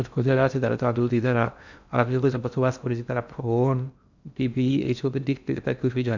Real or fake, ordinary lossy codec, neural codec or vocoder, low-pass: fake; none; codec, 16 kHz in and 24 kHz out, 0.6 kbps, FocalCodec, streaming, 2048 codes; 7.2 kHz